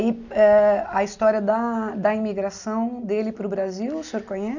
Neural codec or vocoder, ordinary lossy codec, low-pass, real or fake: none; none; 7.2 kHz; real